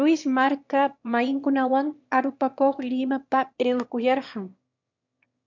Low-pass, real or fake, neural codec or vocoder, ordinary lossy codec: 7.2 kHz; fake; autoencoder, 22.05 kHz, a latent of 192 numbers a frame, VITS, trained on one speaker; MP3, 64 kbps